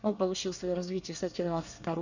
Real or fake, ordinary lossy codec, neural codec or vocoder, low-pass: fake; none; codec, 24 kHz, 1 kbps, SNAC; 7.2 kHz